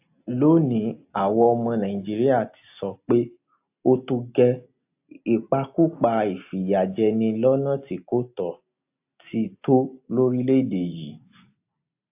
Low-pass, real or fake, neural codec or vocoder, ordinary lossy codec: 3.6 kHz; real; none; AAC, 32 kbps